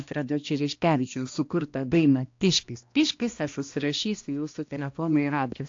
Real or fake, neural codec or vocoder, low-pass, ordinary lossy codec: fake; codec, 16 kHz, 1 kbps, X-Codec, HuBERT features, trained on balanced general audio; 7.2 kHz; AAC, 48 kbps